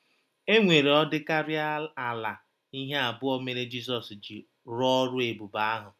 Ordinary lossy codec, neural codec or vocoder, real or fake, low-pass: none; none; real; 14.4 kHz